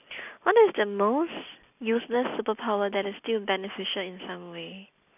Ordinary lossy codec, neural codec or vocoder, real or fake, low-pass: none; none; real; 3.6 kHz